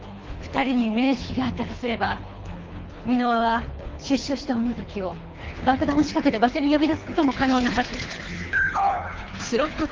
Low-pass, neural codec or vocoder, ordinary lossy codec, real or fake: 7.2 kHz; codec, 24 kHz, 3 kbps, HILCodec; Opus, 32 kbps; fake